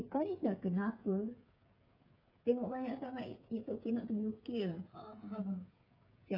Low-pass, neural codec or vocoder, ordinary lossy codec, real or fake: 5.4 kHz; codec, 16 kHz, 16 kbps, FreqCodec, smaller model; none; fake